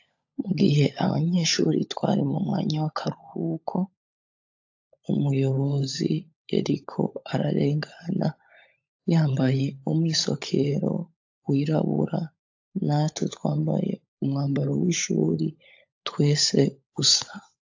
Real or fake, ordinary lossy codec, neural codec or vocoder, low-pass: fake; AAC, 48 kbps; codec, 16 kHz, 16 kbps, FunCodec, trained on LibriTTS, 50 frames a second; 7.2 kHz